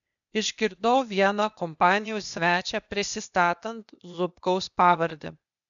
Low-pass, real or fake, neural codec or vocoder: 7.2 kHz; fake; codec, 16 kHz, 0.8 kbps, ZipCodec